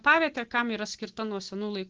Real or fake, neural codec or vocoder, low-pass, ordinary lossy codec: real; none; 7.2 kHz; Opus, 16 kbps